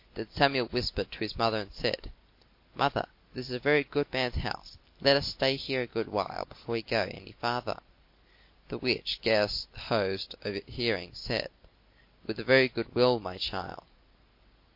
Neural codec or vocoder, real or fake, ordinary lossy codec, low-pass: none; real; MP3, 32 kbps; 5.4 kHz